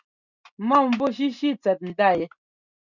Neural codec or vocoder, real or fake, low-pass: none; real; 7.2 kHz